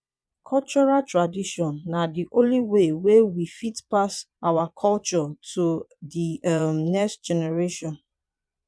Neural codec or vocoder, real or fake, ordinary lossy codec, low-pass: vocoder, 22.05 kHz, 80 mel bands, Vocos; fake; none; none